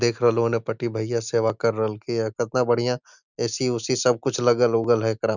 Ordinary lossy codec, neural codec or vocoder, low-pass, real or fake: none; none; 7.2 kHz; real